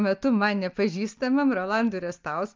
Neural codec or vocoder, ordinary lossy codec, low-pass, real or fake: none; Opus, 24 kbps; 7.2 kHz; real